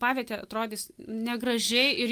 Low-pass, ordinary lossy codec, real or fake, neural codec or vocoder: 14.4 kHz; Opus, 32 kbps; real; none